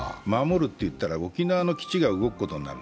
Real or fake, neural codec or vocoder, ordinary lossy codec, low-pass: real; none; none; none